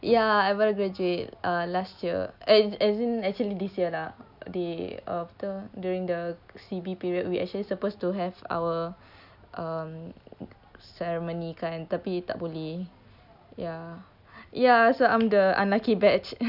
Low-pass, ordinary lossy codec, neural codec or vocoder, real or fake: 5.4 kHz; none; none; real